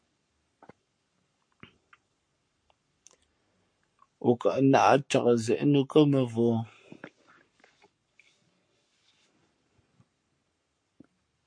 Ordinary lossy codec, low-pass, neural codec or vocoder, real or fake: MP3, 48 kbps; 9.9 kHz; codec, 44.1 kHz, 7.8 kbps, Pupu-Codec; fake